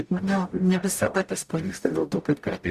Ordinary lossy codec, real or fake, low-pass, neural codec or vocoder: Opus, 64 kbps; fake; 14.4 kHz; codec, 44.1 kHz, 0.9 kbps, DAC